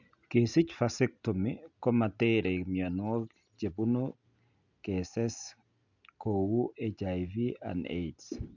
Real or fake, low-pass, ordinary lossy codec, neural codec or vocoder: real; 7.2 kHz; none; none